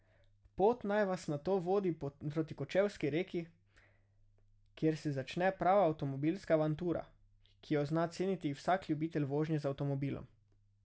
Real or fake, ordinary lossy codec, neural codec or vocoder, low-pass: real; none; none; none